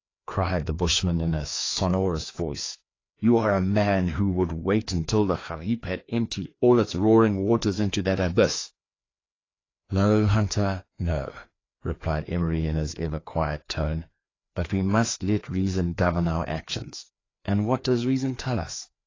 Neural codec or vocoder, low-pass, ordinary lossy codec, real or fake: codec, 16 kHz, 2 kbps, FreqCodec, larger model; 7.2 kHz; AAC, 32 kbps; fake